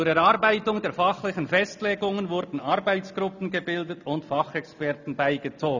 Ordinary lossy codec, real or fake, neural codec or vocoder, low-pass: none; real; none; 7.2 kHz